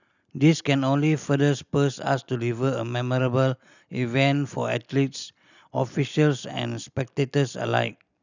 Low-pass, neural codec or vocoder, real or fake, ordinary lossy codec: 7.2 kHz; none; real; none